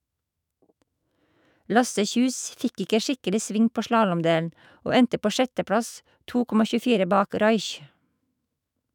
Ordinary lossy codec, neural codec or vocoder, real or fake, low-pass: none; autoencoder, 48 kHz, 128 numbers a frame, DAC-VAE, trained on Japanese speech; fake; 19.8 kHz